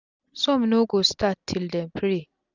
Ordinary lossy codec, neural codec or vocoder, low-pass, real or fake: none; none; 7.2 kHz; real